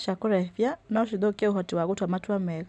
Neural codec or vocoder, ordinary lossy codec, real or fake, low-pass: none; none; real; none